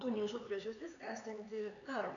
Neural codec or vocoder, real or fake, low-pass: codec, 16 kHz, 4 kbps, X-Codec, HuBERT features, trained on LibriSpeech; fake; 7.2 kHz